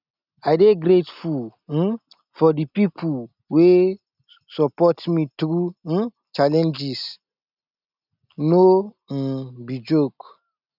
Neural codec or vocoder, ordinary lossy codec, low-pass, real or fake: none; none; 5.4 kHz; real